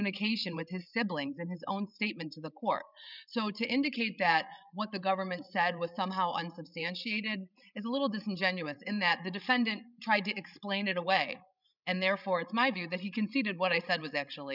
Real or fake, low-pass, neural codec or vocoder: fake; 5.4 kHz; codec, 16 kHz, 16 kbps, FreqCodec, larger model